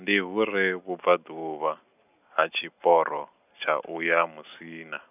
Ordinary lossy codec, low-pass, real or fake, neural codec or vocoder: none; 3.6 kHz; real; none